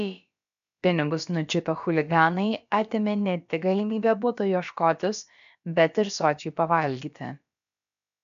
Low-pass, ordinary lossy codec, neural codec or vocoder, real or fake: 7.2 kHz; AAC, 96 kbps; codec, 16 kHz, about 1 kbps, DyCAST, with the encoder's durations; fake